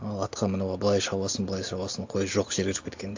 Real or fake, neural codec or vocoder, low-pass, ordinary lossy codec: real; none; 7.2 kHz; AAC, 48 kbps